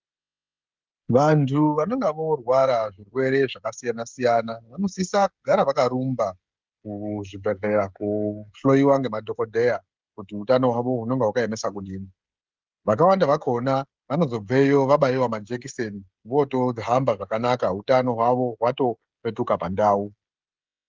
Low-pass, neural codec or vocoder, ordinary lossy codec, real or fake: 7.2 kHz; codec, 16 kHz, 16 kbps, FreqCodec, smaller model; Opus, 32 kbps; fake